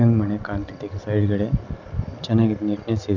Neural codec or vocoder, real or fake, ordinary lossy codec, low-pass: none; real; none; 7.2 kHz